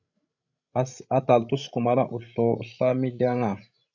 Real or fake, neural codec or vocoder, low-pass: fake; codec, 16 kHz, 16 kbps, FreqCodec, larger model; 7.2 kHz